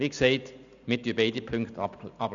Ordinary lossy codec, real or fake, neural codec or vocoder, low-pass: none; real; none; 7.2 kHz